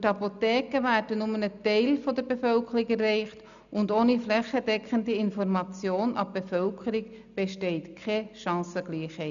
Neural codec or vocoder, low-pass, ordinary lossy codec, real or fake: none; 7.2 kHz; none; real